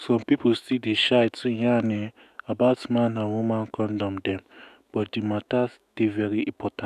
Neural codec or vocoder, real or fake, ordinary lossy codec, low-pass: autoencoder, 48 kHz, 128 numbers a frame, DAC-VAE, trained on Japanese speech; fake; none; 14.4 kHz